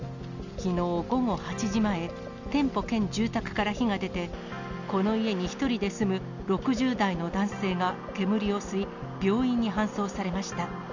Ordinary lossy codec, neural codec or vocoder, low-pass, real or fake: none; none; 7.2 kHz; real